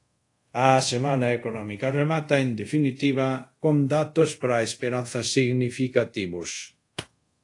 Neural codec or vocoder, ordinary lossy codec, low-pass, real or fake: codec, 24 kHz, 0.5 kbps, DualCodec; AAC, 48 kbps; 10.8 kHz; fake